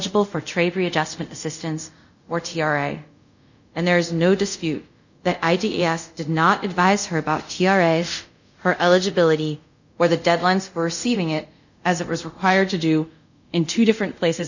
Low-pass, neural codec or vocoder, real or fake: 7.2 kHz; codec, 24 kHz, 0.5 kbps, DualCodec; fake